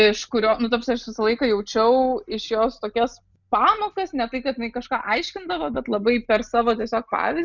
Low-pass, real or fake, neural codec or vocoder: 7.2 kHz; real; none